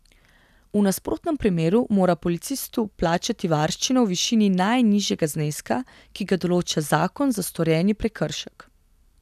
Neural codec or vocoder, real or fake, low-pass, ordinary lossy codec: none; real; 14.4 kHz; none